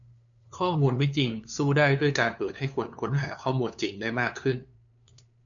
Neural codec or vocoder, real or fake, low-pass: codec, 16 kHz, 2 kbps, FunCodec, trained on LibriTTS, 25 frames a second; fake; 7.2 kHz